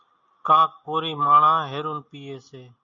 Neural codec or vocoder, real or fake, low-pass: none; real; 7.2 kHz